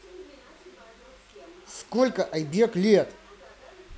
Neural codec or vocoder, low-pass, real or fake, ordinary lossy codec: none; none; real; none